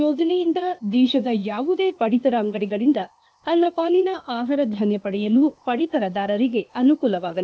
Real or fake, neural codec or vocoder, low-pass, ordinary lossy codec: fake; codec, 16 kHz, 0.8 kbps, ZipCodec; none; none